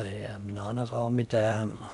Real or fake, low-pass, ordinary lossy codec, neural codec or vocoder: fake; 10.8 kHz; none; codec, 16 kHz in and 24 kHz out, 0.8 kbps, FocalCodec, streaming, 65536 codes